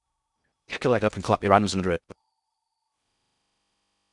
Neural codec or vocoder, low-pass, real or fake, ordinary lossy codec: codec, 16 kHz in and 24 kHz out, 0.6 kbps, FocalCodec, streaming, 2048 codes; 10.8 kHz; fake; MP3, 96 kbps